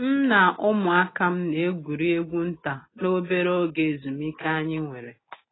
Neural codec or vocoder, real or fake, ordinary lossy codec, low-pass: none; real; AAC, 16 kbps; 7.2 kHz